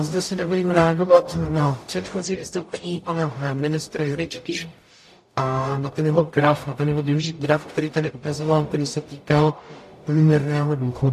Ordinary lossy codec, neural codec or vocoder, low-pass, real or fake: AAC, 64 kbps; codec, 44.1 kHz, 0.9 kbps, DAC; 14.4 kHz; fake